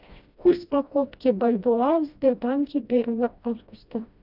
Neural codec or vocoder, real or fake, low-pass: codec, 16 kHz, 1 kbps, FreqCodec, smaller model; fake; 5.4 kHz